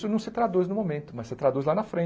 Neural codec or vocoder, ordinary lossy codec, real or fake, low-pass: none; none; real; none